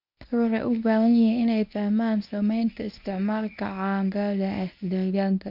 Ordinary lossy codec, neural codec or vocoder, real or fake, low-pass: none; codec, 24 kHz, 0.9 kbps, WavTokenizer, medium speech release version 1; fake; 5.4 kHz